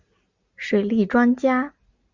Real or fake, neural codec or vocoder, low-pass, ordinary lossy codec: real; none; 7.2 kHz; Opus, 64 kbps